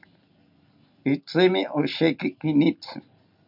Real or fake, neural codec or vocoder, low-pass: real; none; 5.4 kHz